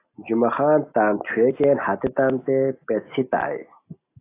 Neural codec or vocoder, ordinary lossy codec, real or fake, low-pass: none; AAC, 24 kbps; real; 3.6 kHz